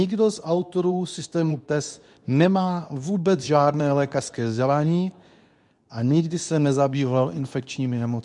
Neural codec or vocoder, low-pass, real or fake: codec, 24 kHz, 0.9 kbps, WavTokenizer, medium speech release version 2; 10.8 kHz; fake